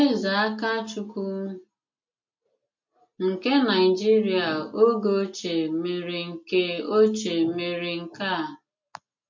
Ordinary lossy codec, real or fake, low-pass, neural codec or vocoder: MP3, 48 kbps; real; 7.2 kHz; none